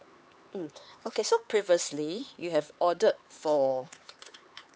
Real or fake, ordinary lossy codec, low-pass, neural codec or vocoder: fake; none; none; codec, 16 kHz, 4 kbps, X-Codec, HuBERT features, trained on LibriSpeech